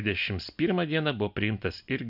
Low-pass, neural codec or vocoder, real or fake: 5.4 kHz; none; real